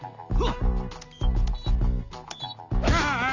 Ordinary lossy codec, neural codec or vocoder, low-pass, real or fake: none; none; 7.2 kHz; real